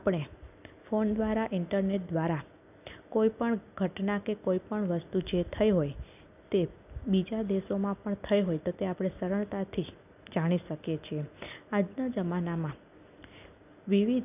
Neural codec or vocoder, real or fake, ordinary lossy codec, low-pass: none; real; none; 3.6 kHz